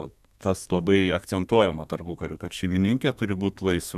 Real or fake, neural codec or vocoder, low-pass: fake; codec, 32 kHz, 1.9 kbps, SNAC; 14.4 kHz